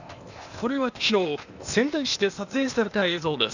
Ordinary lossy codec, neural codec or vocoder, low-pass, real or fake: none; codec, 16 kHz, 0.8 kbps, ZipCodec; 7.2 kHz; fake